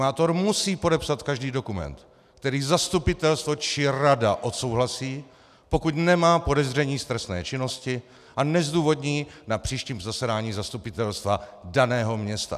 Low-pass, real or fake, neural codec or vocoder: 14.4 kHz; real; none